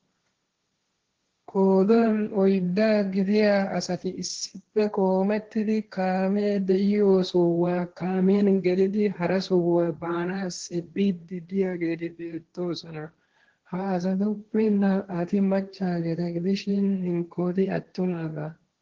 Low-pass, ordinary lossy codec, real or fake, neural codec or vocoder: 7.2 kHz; Opus, 16 kbps; fake; codec, 16 kHz, 1.1 kbps, Voila-Tokenizer